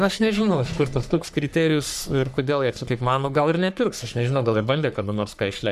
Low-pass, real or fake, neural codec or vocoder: 14.4 kHz; fake; codec, 44.1 kHz, 3.4 kbps, Pupu-Codec